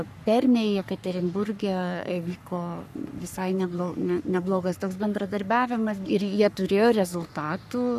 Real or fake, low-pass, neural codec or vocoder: fake; 14.4 kHz; codec, 44.1 kHz, 3.4 kbps, Pupu-Codec